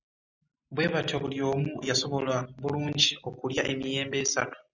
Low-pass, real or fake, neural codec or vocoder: 7.2 kHz; real; none